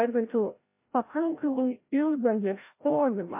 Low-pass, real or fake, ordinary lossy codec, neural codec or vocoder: 3.6 kHz; fake; MP3, 24 kbps; codec, 16 kHz, 0.5 kbps, FreqCodec, larger model